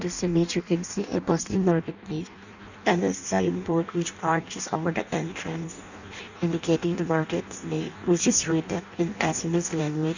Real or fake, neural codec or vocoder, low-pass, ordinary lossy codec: fake; codec, 16 kHz in and 24 kHz out, 0.6 kbps, FireRedTTS-2 codec; 7.2 kHz; none